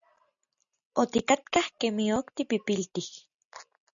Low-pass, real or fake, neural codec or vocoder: 7.2 kHz; real; none